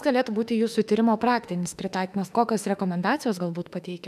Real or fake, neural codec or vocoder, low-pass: fake; autoencoder, 48 kHz, 32 numbers a frame, DAC-VAE, trained on Japanese speech; 14.4 kHz